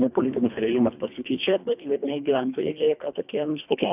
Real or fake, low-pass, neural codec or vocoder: fake; 3.6 kHz; codec, 24 kHz, 1.5 kbps, HILCodec